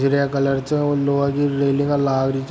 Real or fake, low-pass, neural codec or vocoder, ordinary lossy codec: real; none; none; none